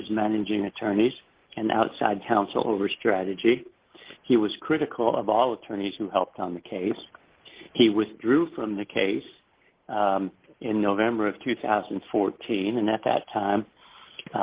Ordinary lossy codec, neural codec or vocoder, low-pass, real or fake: Opus, 16 kbps; none; 3.6 kHz; real